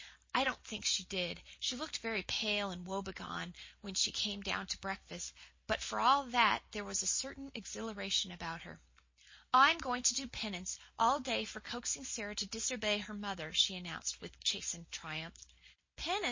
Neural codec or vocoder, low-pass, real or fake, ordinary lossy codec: none; 7.2 kHz; real; MP3, 32 kbps